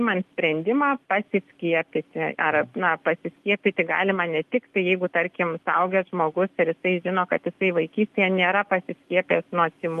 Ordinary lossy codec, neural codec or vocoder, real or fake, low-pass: Opus, 32 kbps; none; real; 14.4 kHz